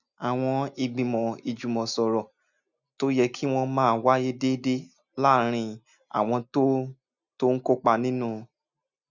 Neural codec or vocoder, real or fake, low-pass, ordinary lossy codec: none; real; 7.2 kHz; none